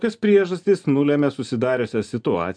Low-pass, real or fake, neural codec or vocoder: 9.9 kHz; real; none